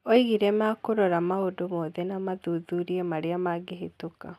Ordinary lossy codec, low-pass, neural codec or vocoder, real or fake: none; 14.4 kHz; none; real